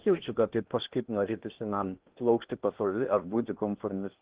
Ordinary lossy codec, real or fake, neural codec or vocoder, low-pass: Opus, 16 kbps; fake; codec, 16 kHz in and 24 kHz out, 0.8 kbps, FocalCodec, streaming, 65536 codes; 3.6 kHz